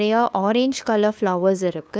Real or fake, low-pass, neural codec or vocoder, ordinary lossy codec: fake; none; codec, 16 kHz, 2 kbps, FunCodec, trained on LibriTTS, 25 frames a second; none